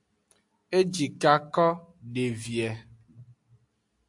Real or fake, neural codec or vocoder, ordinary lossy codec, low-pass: real; none; AAC, 64 kbps; 10.8 kHz